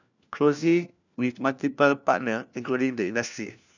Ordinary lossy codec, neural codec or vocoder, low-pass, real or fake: none; codec, 16 kHz, 1 kbps, FunCodec, trained on LibriTTS, 50 frames a second; 7.2 kHz; fake